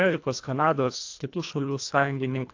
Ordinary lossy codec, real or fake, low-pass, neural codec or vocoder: AAC, 48 kbps; fake; 7.2 kHz; codec, 24 kHz, 1.5 kbps, HILCodec